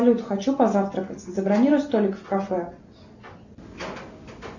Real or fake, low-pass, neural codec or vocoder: real; 7.2 kHz; none